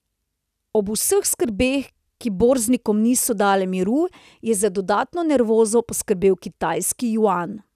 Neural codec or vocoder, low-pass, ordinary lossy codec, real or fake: none; 14.4 kHz; none; real